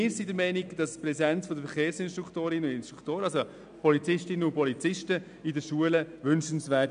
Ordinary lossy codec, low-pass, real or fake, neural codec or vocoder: none; 9.9 kHz; real; none